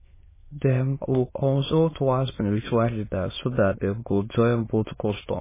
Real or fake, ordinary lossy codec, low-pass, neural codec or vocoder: fake; MP3, 16 kbps; 3.6 kHz; autoencoder, 22.05 kHz, a latent of 192 numbers a frame, VITS, trained on many speakers